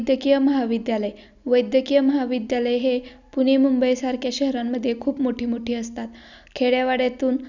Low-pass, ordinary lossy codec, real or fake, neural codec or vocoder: 7.2 kHz; none; real; none